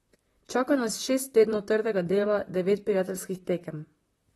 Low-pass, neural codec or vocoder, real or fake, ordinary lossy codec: 19.8 kHz; vocoder, 44.1 kHz, 128 mel bands, Pupu-Vocoder; fake; AAC, 32 kbps